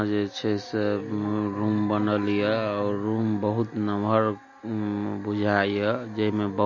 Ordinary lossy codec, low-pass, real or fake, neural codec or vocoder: MP3, 32 kbps; 7.2 kHz; real; none